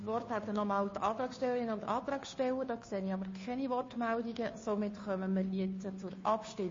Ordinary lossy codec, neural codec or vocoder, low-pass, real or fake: MP3, 32 kbps; codec, 16 kHz, 2 kbps, FunCodec, trained on Chinese and English, 25 frames a second; 7.2 kHz; fake